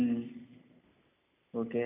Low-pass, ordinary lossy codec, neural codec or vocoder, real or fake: 3.6 kHz; none; none; real